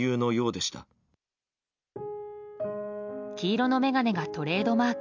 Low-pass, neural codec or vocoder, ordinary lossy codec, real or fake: 7.2 kHz; none; none; real